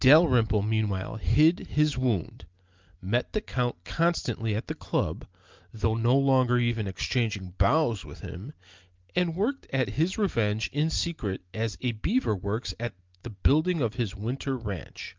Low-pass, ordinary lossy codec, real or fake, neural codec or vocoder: 7.2 kHz; Opus, 32 kbps; real; none